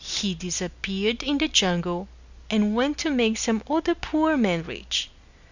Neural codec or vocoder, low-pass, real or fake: none; 7.2 kHz; real